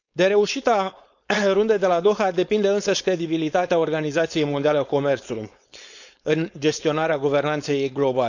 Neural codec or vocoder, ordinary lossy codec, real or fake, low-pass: codec, 16 kHz, 4.8 kbps, FACodec; none; fake; 7.2 kHz